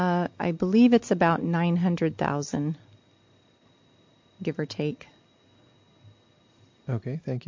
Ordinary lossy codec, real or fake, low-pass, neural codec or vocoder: MP3, 48 kbps; real; 7.2 kHz; none